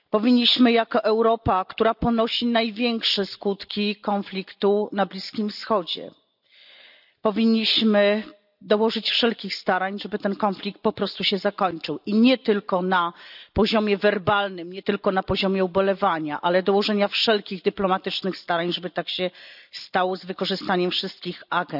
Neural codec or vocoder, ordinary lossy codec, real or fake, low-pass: none; none; real; 5.4 kHz